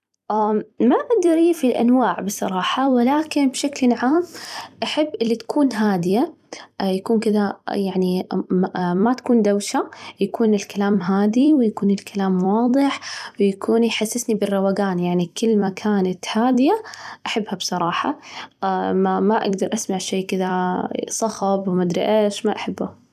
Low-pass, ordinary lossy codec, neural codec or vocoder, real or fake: 14.4 kHz; none; vocoder, 44.1 kHz, 128 mel bands every 256 samples, BigVGAN v2; fake